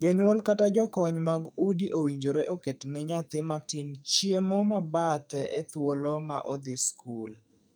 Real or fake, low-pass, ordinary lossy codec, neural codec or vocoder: fake; none; none; codec, 44.1 kHz, 2.6 kbps, SNAC